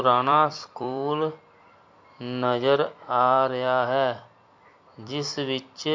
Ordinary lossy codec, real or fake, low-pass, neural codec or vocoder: MP3, 48 kbps; fake; 7.2 kHz; vocoder, 44.1 kHz, 128 mel bands every 256 samples, BigVGAN v2